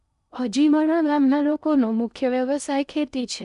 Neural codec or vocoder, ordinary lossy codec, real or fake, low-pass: codec, 16 kHz in and 24 kHz out, 0.8 kbps, FocalCodec, streaming, 65536 codes; none; fake; 10.8 kHz